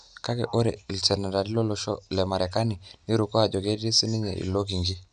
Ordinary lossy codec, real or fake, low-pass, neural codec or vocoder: MP3, 96 kbps; real; 9.9 kHz; none